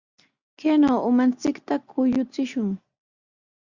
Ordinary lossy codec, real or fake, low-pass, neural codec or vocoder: Opus, 64 kbps; real; 7.2 kHz; none